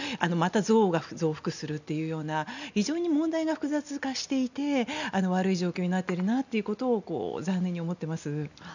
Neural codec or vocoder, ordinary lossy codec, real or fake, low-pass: none; none; real; 7.2 kHz